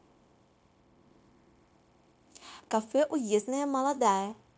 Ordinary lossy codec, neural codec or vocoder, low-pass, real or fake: none; codec, 16 kHz, 0.9 kbps, LongCat-Audio-Codec; none; fake